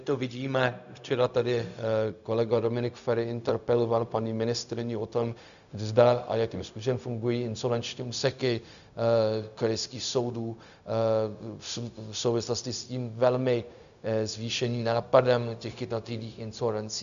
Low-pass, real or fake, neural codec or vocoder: 7.2 kHz; fake; codec, 16 kHz, 0.4 kbps, LongCat-Audio-Codec